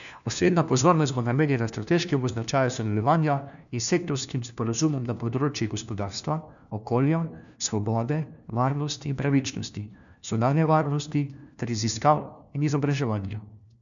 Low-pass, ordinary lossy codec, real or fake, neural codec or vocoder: 7.2 kHz; none; fake; codec, 16 kHz, 1 kbps, FunCodec, trained on LibriTTS, 50 frames a second